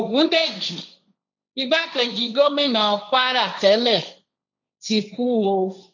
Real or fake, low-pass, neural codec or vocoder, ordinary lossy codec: fake; 7.2 kHz; codec, 16 kHz, 1.1 kbps, Voila-Tokenizer; none